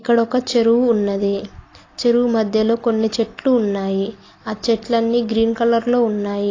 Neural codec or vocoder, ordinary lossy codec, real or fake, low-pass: none; AAC, 32 kbps; real; 7.2 kHz